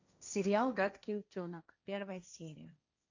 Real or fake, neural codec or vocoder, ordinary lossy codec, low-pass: fake; codec, 16 kHz, 1.1 kbps, Voila-Tokenizer; AAC, 48 kbps; 7.2 kHz